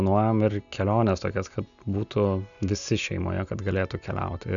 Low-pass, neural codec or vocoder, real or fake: 7.2 kHz; none; real